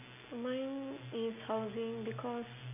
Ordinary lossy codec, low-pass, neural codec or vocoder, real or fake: AAC, 24 kbps; 3.6 kHz; none; real